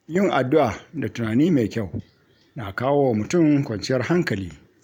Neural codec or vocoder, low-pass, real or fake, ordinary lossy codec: vocoder, 44.1 kHz, 128 mel bands every 512 samples, BigVGAN v2; 19.8 kHz; fake; none